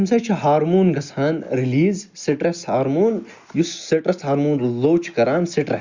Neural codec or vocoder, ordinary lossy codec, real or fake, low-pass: none; Opus, 64 kbps; real; 7.2 kHz